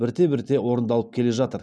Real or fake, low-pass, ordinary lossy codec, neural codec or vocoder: real; none; none; none